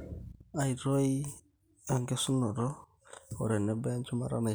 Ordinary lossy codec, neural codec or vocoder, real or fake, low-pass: none; none; real; none